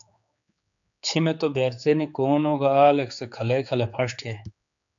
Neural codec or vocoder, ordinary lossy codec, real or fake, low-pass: codec, 16 kHz, 4 kbps, X-Codec, HuBERT features, trained on general audio; AAC, 64 kbps; fake; 7.2 kHz